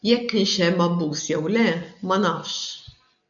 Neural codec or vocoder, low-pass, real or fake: none; 7.2 kHz; real